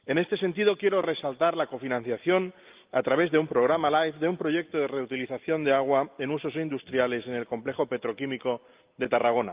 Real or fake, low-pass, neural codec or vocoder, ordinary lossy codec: real; 3.6 kHz; none; Opus, 32 kbps